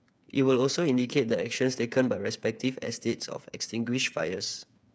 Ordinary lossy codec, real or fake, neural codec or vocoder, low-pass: none; fake; codec, 16 kHz, 8 kbps, FreqCodec, smaller model; none